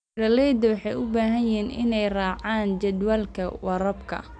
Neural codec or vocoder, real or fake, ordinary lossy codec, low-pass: none; real; none; 9.9 kHz